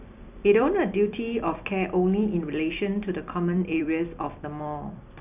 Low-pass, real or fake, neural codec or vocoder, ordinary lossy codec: 3.6 kHz; fake; vocoder, 44.1 kHz, 128 mel bands every 256 samples, BigVGAN v2; none